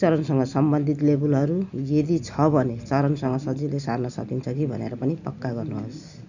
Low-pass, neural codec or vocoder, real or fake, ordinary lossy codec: 7.2 kHz; none; real; none